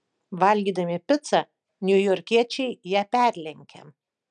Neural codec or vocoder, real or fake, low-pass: none; real; 9.9 kHz